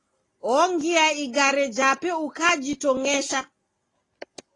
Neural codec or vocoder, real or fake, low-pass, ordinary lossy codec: none; real; 10.8 kHz; AAC, 32 kbps